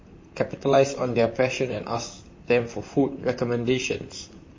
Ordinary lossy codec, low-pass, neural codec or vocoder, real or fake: MP3, 32 kbps; 7.2 kHz; vocoder, 44.1 kHz, 128 mel bands, Pupu-Vocoder; fake